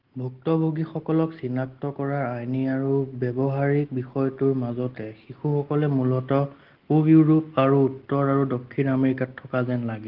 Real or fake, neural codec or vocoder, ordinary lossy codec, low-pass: real; none; Opus, 16 kbps; 5.4 kHz